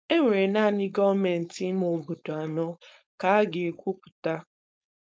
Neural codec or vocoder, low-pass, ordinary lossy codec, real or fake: codec, 16 kHz, 4.8 kbps, FACodec; none; none; fake